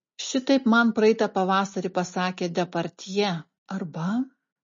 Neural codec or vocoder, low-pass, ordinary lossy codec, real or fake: none; 7.2 kHz; MP3, 32 kbps; real